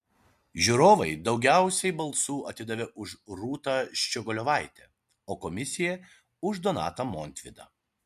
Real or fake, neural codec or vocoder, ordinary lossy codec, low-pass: real; none; MP3, 64 kbps; 14.4 kHz